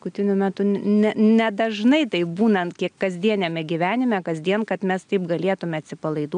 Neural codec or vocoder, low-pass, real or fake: none; 9.9 kHz; real